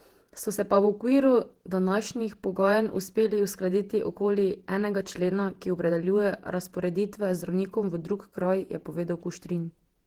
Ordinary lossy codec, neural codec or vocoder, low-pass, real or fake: Opus, 16 kbps; vocoder, 48 kHz, 128 mel bands, Vocos; 19.8 kHz; fake